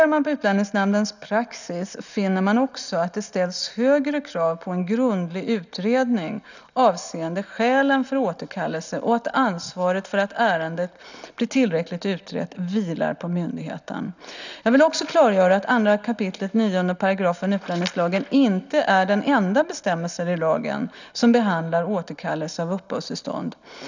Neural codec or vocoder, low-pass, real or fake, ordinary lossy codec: none; 7.2 kHz; real; none